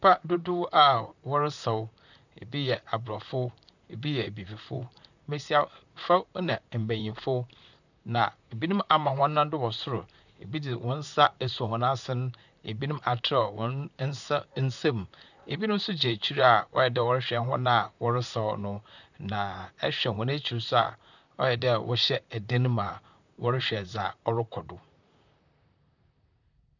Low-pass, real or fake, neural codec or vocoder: 7.2 kHz; fake; vocoder, 44.1 kHz, 128 mel bands, Pupu-Vocoder